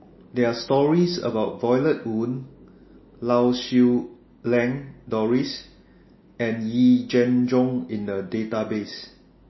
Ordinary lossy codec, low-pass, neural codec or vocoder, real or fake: MP3, 24 kbps; 7.2 kHz; none; real